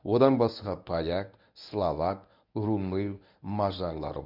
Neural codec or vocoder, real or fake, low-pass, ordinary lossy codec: codec, 24 kHz, 0.9 kbps, WavTokenizer, medium speech release version 1; fake; 5.4 kHz; none